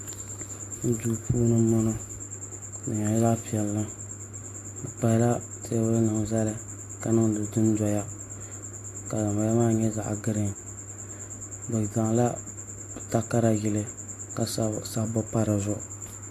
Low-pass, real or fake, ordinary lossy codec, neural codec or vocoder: 14.4 kHz; real; AAC, 64 kbps; none